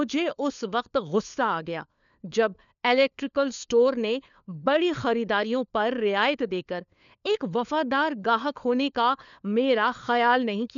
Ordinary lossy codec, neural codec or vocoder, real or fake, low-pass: none; codec, 16 kHz, 4 kbps, FunCodec, trained on LibriTTS, 50 frames a second; fake; 7.2 kHz